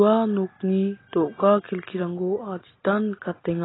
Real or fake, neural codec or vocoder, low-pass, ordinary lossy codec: real; none; 7.2 kHz; AAC, 16 kbps